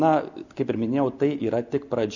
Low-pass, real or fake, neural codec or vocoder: 7.2 kHz; real; none